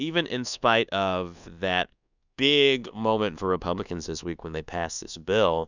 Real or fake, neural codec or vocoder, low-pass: fake; codec, 24 kHz, 1.2 kbps, DualCodec; 7.2 kHz